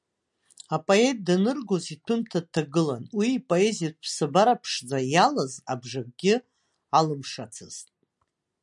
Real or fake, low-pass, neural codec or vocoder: real; 10.8 kHz; none